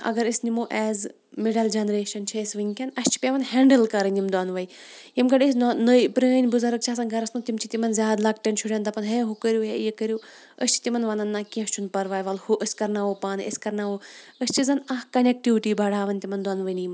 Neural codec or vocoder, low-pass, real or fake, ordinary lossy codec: none; none; real; none